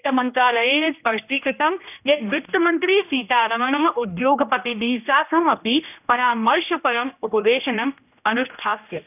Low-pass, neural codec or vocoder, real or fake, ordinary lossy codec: 3.6 kHz; codec, 16 kHz, 1 kbps, X-Codec, HuBERT features, trained on general audio; fake; none